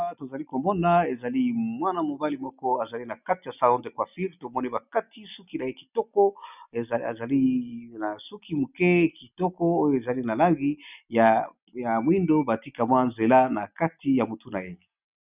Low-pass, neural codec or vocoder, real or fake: 3.6 kHz; none; real